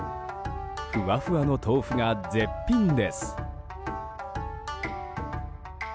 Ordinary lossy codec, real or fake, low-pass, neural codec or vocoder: none; real; none; none